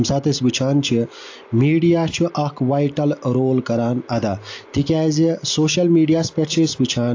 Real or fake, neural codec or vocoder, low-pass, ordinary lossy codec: real; none; 7.2 kHz; AAC, 48 kbps